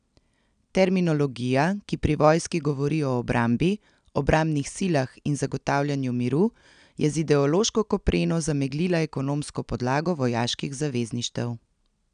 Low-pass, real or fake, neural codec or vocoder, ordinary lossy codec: 9.9 kHz; real; none; none